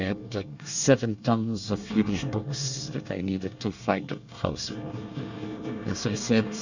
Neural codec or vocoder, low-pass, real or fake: codec, 24 kHz, 1 kbps, SNAC; 7.2 kHz; fake